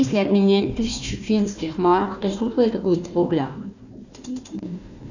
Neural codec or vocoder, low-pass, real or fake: codec, 16 kHz, 1 kbps, FunCodec, trained on Chinese and English, 50 frames a second; 7.2 kHz; fake